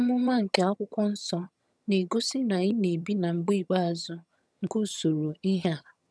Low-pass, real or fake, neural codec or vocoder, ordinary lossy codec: none; fake; vocoder, 22.05 kHz, 80 mel bands, HiFi-GAN; none